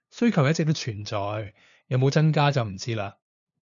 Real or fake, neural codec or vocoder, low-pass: fake; codec, 16 kHz, 2 kbps, FunCodec, trained on LibriTTS, 25 frames a second; 7.2 kHz